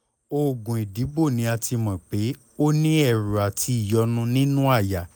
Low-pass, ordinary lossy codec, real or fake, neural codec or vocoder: none; none; real; none